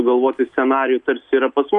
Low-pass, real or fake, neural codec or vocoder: 10.8 kHz; real; none